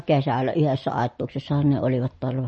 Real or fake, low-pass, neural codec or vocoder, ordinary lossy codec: real; 10.8 kHz; none; MP3, 32 kbps